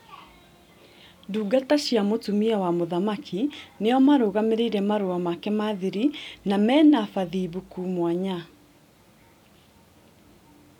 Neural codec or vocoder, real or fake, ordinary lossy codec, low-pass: none; real; none; 19.8 kHz